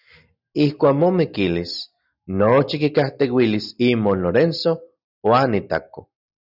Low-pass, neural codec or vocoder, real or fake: 5.4 kHz; none; real